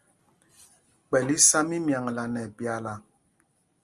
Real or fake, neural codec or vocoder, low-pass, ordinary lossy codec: real; none; 10.8 kHz; Opus, 32 kbps